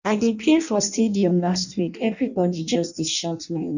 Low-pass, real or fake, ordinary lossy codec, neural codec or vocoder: 7.2 kHz; fake; none; codec, 16 kHz in and 24 kHz out, 0.6 kbps, FireRedTTS-2 codec